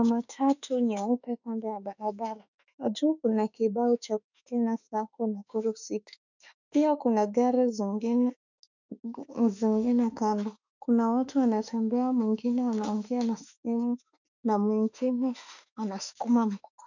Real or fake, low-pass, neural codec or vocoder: fake; 7.2 kHz; autoencoder, 48 kHz, 32 numbers a frame, DAC-VAE, trained on Japanese speech